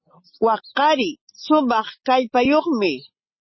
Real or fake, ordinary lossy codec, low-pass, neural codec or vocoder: real; MP3, 24 kbps; 7.2 kHz; none